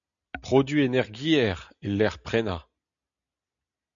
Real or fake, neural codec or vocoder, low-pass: real; none; 7.2 kHz